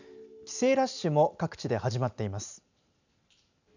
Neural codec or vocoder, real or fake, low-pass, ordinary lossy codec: none; real; 7.2 kHz; none